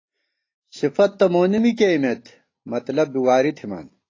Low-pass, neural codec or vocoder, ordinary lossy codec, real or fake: 7.2 kHz; none; AAC, 32 kbps; real